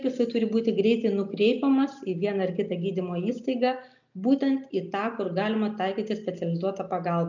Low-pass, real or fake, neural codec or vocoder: 7.2 kHz; real; none